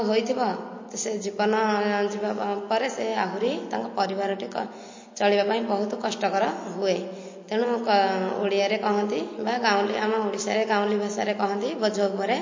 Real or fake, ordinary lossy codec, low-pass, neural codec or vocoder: real; MP3, 32 kbps; 7.2 kHz; none